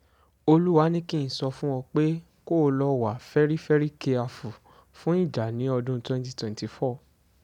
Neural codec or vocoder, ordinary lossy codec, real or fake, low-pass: none; none; real; 19.8 kHz